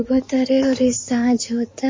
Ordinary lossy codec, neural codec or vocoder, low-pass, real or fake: MP3, 32 kbps; codec, 16 kHz in and 24 kHz out, 2.2 kbps, FireRedTTS-2 codec; 7.2 kHz; fake